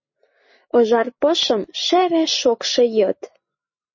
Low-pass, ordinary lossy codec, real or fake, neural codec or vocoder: 7.2 kHz; MP3, 32 kbps; real; none